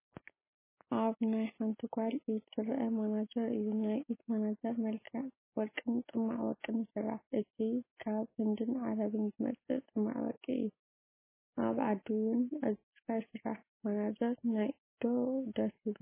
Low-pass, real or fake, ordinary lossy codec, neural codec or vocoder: 3.6 kHz; real; MP3, 16 kbps; none